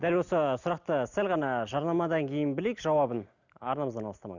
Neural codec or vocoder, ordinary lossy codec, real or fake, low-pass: none; none; real; 7.2 kHz